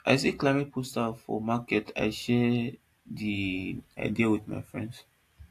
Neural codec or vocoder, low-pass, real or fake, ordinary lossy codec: none; 14.4 kHz; real; AAC, 64 kbps